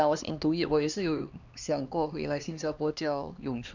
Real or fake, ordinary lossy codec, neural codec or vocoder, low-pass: fake; none; codec, 16 kHz, 2 kbps, X-Codec, HuBERT features, trained on LibriSpeech; 7.2 kHz